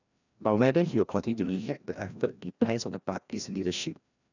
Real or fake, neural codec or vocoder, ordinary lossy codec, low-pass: fake; codec, 16 kHz, 1 kbps, FreqCodec, larger model; none; 7.2 kHz